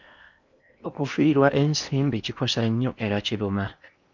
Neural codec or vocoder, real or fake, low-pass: codec, 16 kHz in and 24 kHz out, 0.8 kbps, FocalCodec, streaming, 65536 codes; fake; 7.2 kHz